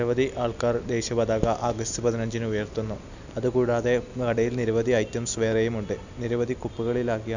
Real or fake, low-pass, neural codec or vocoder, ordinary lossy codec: real; 7.2 kHz; none; none